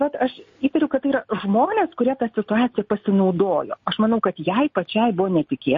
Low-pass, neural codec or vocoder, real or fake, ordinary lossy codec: 7.2 kHz; none; real; MP3, 32 kbps